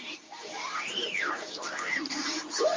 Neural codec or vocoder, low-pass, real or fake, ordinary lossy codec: codec, 24 kHz, 0.9 kbps, WavTokenizer, medium speech release version 2; 7.2 kHz; fake; Opus, 32 kbps